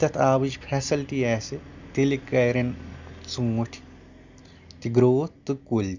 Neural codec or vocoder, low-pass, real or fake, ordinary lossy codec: none; 7.2 kHz; real; none